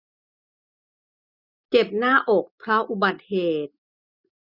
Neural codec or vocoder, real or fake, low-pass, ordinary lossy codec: none; real; 5.4 kHz; none